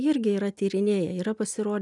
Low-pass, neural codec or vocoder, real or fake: 10.8 kHz; none; real